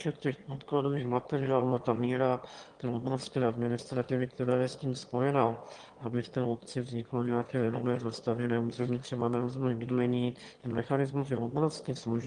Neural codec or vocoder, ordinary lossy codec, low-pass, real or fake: autoencoder, 22.05 kHz, a latent of 192 numbers a frame, VITS, trained on one speaker; Opus, 24 kbps; 9.9 kHz; fake